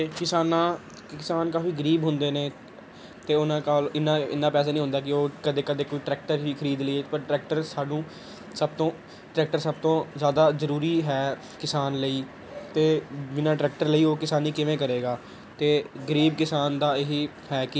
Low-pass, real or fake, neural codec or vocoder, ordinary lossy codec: none; real; none; none